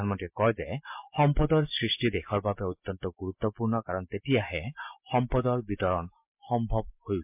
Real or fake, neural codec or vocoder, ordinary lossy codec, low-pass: real; none; MP3, 32 kbps; 3.6 kHz